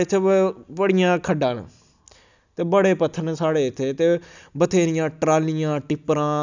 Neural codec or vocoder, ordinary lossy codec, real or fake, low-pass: none; none; real; 7.2 kHz